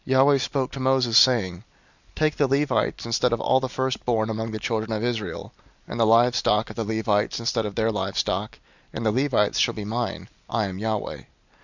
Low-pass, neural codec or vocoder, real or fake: 7.2 kHz; none; real